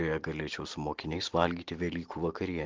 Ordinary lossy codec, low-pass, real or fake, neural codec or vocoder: Opus, 16 kbps; 7.2 kHz; real; none